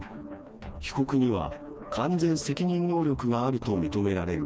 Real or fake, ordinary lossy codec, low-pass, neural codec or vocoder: fake; none; none; codec, 16 kHz, 2 kbps, FreqCodec, smaller model